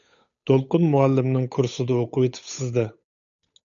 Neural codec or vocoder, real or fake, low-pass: codec, 16 kHz, 8 kbps, FunCodec, trained on Chinese and English, 25 frames a second; fake; 7.2 kHz